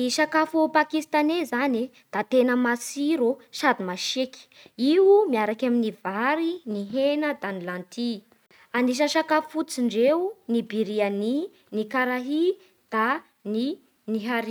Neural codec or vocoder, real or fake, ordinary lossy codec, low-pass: none; real; none; none